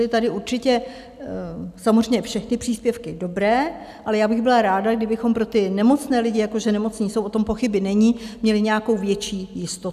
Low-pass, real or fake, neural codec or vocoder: 14.4 kHz; real; none